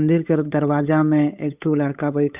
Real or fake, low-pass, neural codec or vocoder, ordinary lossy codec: fake; 3.6 kHz; codec, 16 kHz, 8 kbps, FunCodec, trained on Chinese and English, 25 frames a second; none